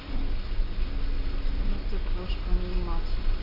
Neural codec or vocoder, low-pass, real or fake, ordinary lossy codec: none; 5.4 kHz; real; AAC, 48 kbps